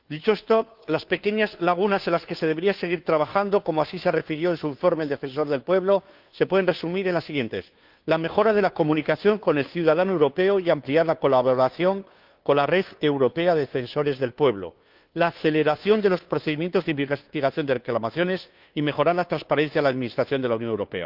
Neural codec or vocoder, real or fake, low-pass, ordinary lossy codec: codec, 16 kHz, 2 kbps, FunCodec, trained on Chinese and English, 25 frames a second; fake; 5.4 kHz; Opus, 24 kbps